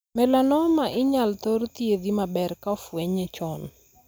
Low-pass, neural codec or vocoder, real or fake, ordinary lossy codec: none; none; real; none